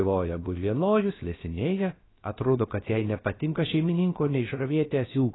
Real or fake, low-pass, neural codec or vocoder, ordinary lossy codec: fake; 7.2 kHz; codec, 16 kHz, 0.7 kbps, FocalCodec; AAC, 16 kbps